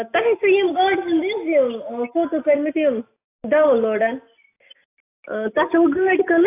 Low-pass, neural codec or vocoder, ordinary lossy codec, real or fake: 3.6 kHz; none; AAC, 24 kbps; real